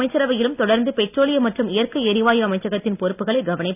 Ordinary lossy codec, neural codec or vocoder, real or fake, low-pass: none; none; real; 3.6 kHz